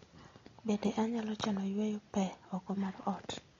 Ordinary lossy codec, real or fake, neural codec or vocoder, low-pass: AAC, 32 kbps; real; none; 7.2 kHz